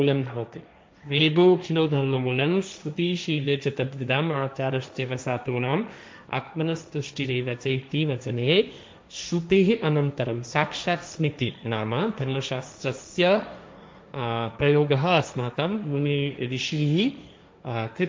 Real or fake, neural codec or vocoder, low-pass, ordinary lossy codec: fake; codec, 16 kHz, 1.1 kbps, Voila-Tokenizer; none; none